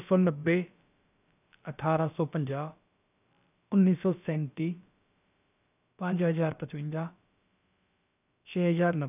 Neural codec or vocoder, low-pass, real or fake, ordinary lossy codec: codec, 16 kHz, about 1 kbps, DyCAST, with the encoder's durations; 3.6 kHz; fake; none